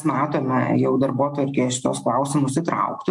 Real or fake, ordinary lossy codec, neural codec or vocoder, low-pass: real; MP3, 64 kbps; none; 10.8 kHz